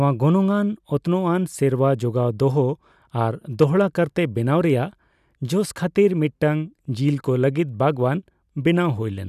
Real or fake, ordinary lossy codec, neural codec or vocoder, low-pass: real; none; none; 14.4 kHz